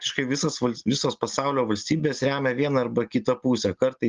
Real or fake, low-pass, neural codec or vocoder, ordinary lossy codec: real; 7.2 kHz; none; Opus, 32 kbps